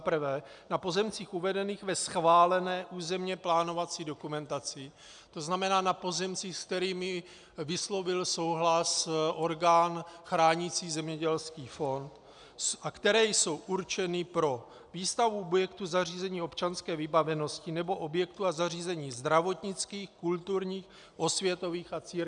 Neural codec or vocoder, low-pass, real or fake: none; 9.9 kHz; real